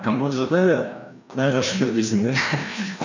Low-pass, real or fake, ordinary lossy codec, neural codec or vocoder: 7.2 kHz; fake; none; codec, 16 kHz, 1 kbps, FreqCodec, larger model